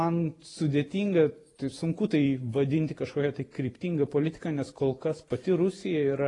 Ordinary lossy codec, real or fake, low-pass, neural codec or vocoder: AAC, 32 kbps; fake; 10.8 kHz; vocoder, 44.1 kHz, 128 mel bands every 512 samples, BigVGAN v2